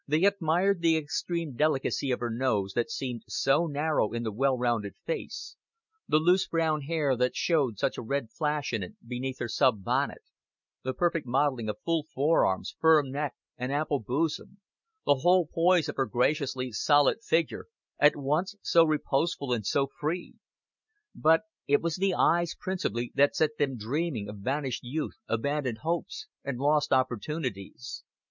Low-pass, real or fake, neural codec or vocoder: 7.2 kHz; real; none